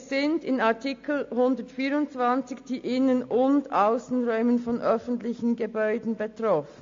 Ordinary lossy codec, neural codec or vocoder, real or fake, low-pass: AAC, 48 kbps; none; real; 7.2 kHz